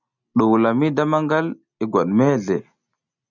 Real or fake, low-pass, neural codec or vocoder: real; 7.2 kHz; none